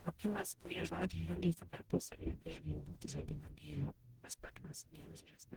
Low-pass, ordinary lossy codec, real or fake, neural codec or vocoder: 19.8 kHz; Opus, 16 kbps; fake; codec, 44.1 kHz, 0.9 kbps, DAC